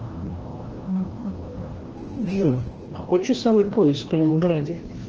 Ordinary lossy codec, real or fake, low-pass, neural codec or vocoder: Opus, 16 kbps; fake; 7.2 kHz; codec, 16 kHz, 1 kbps, FreqCodec, larger model